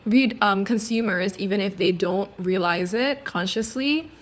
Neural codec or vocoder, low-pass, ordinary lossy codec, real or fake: codec, 16 kHz, 4 kbps, FunCodec, trained on LibriTTS, 50 frames a second; none; none; fake